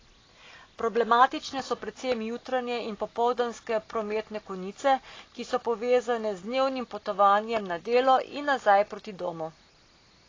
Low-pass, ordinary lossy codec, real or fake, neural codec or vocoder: 7.2 kHz; AAC, 32 kbps; real; none